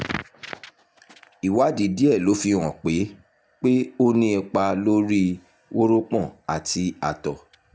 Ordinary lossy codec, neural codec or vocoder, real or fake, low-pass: none; none; real; none